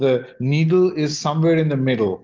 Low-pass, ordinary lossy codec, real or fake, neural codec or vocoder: 7.2 kHz; Opus, 16 kbps; real; none